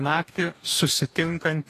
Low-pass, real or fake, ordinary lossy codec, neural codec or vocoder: 14.4 kHz; fake; AAC, 48 kbps; codec, 44.1 kHz, 2.6 kbps, DAC